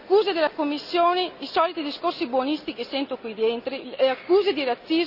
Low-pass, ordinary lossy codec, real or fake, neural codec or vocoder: 5.4 kHz; Opus, 64 kbps; real; none